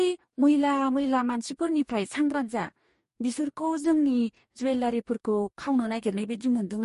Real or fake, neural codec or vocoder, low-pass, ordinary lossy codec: fake; codec, 44.1 kHz, 2.6 kbps, DAC; 14.4 kHz; MP3, 48 kbps